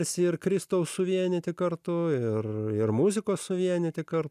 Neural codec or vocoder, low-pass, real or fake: none; 14.4 kHz; real